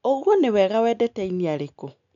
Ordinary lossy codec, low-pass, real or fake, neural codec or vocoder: none; 7.2 kHz; real; none